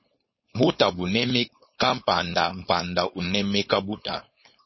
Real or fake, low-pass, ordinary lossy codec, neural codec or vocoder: fake; 7.2 kHz; MP3, 24 kbps; codec, 16 kHz, 4.8 kbps, FACodec